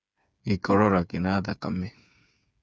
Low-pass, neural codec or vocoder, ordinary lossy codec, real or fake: none; codec, 16 kHz, 8 kbps, FreqCodec, smaller model; none; fake